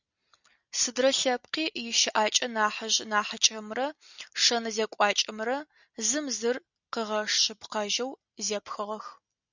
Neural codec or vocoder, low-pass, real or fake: none; 7.2 kHz; real